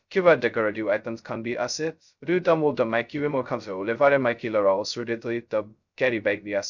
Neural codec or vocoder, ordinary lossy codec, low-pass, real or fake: codec, 16 kHz, 0.2 kbps, FocalCodec; none; 7.2 kHz; fake